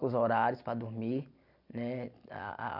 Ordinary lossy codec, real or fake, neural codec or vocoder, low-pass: none; real; none; 5.4 kHz